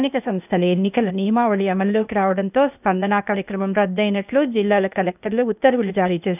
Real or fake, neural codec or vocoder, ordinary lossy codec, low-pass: fake; codec, 16 kHz, 0.8 kbps, ZipCodec; none; 3.6 kHz